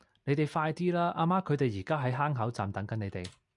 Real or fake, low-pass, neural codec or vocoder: real; 10.8 kHz; none